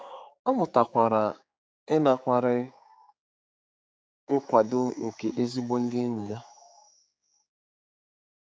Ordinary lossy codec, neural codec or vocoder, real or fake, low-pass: none; codec, 16 kHz, 4 kbps, X-Codec, HuBERT features, trained on general audio; fake; none